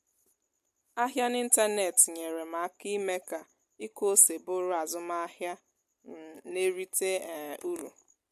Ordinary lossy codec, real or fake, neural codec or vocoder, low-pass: MP3, 64 kbps; real; none; 14.4 kHz